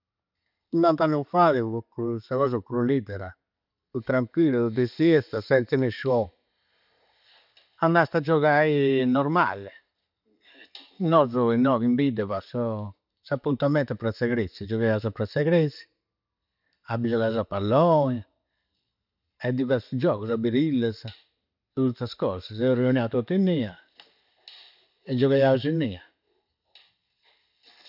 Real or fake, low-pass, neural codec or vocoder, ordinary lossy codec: real; 5.4 kHz; none; none